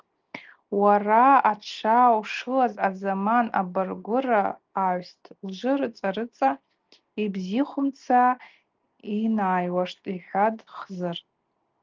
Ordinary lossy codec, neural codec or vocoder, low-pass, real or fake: Opus, 32 kbps; none; 7.2 kHz; real